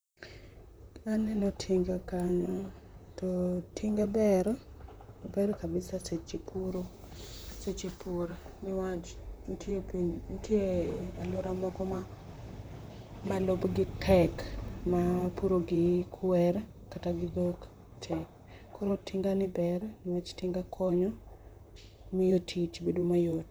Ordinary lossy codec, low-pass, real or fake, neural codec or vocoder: none; none; fake; vocoder, 44.1 kHz, 128 mel bands, Pupu-Vocoder